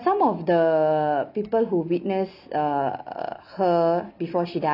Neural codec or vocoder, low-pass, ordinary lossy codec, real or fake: none; 5.4 kHz; none; real